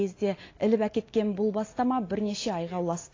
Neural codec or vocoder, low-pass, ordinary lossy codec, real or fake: none; 7.2 kHz; AAC, 32 kbps; real